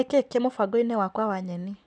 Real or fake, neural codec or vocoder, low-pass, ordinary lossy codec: real; none; 9.9 kHz; none